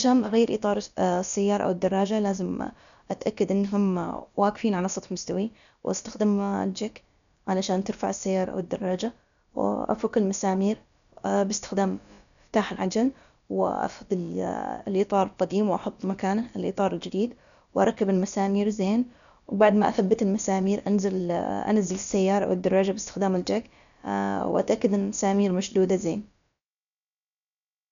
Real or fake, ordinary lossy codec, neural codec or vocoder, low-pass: fake; none; codec, 16 kHz, about 1 kbps, DyCAST, with the encoder's durations; 7.2 kHz